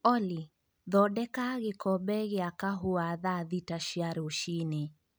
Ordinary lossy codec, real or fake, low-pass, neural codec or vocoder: none; real; none; none